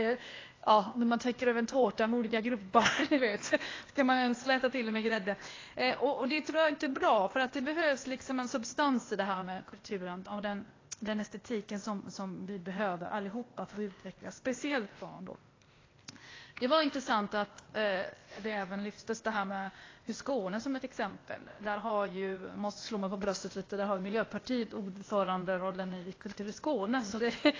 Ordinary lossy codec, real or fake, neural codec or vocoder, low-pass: AAC, 32 kbps; fake; codec, 16 kHz, 0.8 kbps, ZipCodec; 7.2 kHz